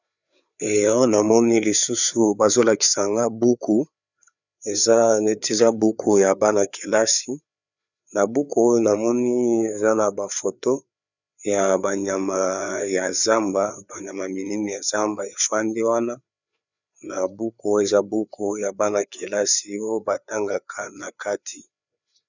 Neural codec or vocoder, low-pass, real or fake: codec, 16 kHz, 4 kbps, FreqCodec, larger model; 7.2 kHz; fake